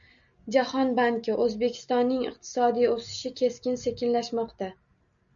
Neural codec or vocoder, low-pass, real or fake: none; 7.2 kHz; real